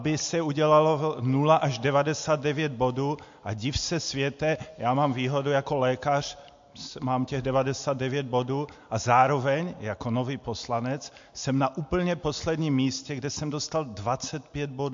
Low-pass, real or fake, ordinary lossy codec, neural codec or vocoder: 7.2 kHz; real; MP3, 48 kbps; none